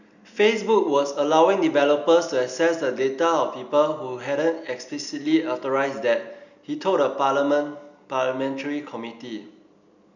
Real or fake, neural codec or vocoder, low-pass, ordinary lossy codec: real; none; 7.2 kHz; none